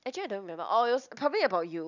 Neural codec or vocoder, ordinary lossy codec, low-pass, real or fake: none; none; 7.2 kHz; real